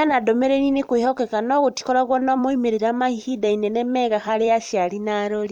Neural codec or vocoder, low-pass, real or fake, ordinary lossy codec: vocoder, 44.1 kHz, 128 mel bands every 256 samples, BigVGAN v2; 19.8 kHz; fake; none